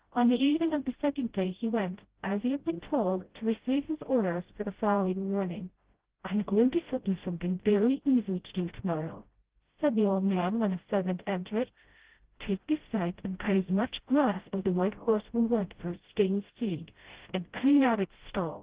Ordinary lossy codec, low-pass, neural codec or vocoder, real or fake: Opus, 16 kbps; 3.6 kHz; codec, 16 kHz, 0.5 kbps, FreqCodec, smaller model; fake